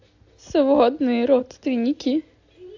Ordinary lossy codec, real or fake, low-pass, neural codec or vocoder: AAC, 48 kbps; real; 7.2 kHz; none